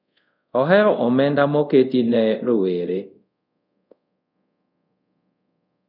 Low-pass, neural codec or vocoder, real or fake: 5.4 kHz; codec, 24 kHz, 0.5 kbps, DualCodec; fake